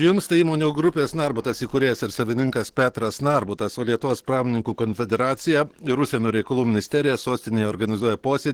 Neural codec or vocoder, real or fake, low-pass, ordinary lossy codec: codec, 44.1 kHz, 7.8 kbps, DAC; fake; 19.8 kHz; Opus, 16 kbps